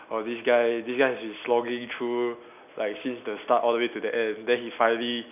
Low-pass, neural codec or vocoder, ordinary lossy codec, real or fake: 3.6 kHz; none; none; real